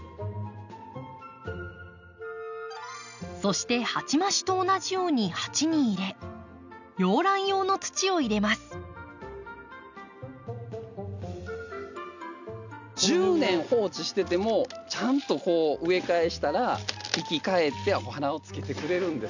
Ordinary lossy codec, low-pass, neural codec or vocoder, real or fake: none; 7.2 kHz; none; real